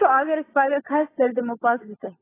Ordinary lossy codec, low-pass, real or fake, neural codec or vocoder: AAC, 16 kbps; 3.6 kHz; fake; codec, 16 kHz, 16 kbps, FunCodec, trained on LibriTTS, 50 frames a second